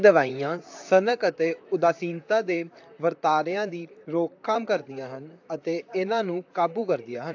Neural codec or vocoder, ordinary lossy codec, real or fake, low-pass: vocoder, 44.1 kHz, 128 mel bands, Pupu-Vocoder; AAC, 48 kbps; fake; 7.2 kHz